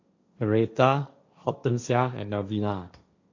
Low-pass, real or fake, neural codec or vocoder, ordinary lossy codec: 7.2 kHz; fake; codec, 16 kHz, 1.1 kbps, Voila-Tokenizer; AAC, 48 kbps